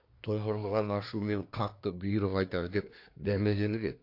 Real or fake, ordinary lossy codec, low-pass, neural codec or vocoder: fake; AAC, 32 kbps; 5.4 kHz; codec, 24 kHz, 1 kbps, SNAC